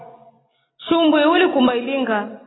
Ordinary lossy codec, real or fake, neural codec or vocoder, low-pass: AAC, 16 kbps; real; none; 7.2 kHz